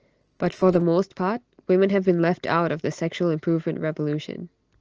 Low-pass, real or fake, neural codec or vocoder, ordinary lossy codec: 7.2 kHz; real; none; Opus, 16 kbps